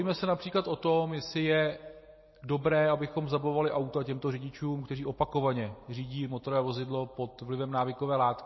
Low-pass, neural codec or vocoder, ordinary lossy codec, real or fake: 7.2 kHz; none; MP3, 24 kbps; real